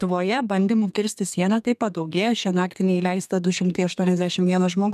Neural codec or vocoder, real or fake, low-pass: codec, 32 kHz, 1.9 kbps, SNAC; fake; 14.4 kHz